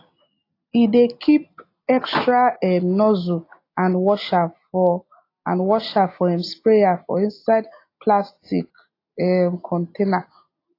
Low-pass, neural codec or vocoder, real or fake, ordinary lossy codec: 5.4 kHz; none; real; AAC, 32 kbps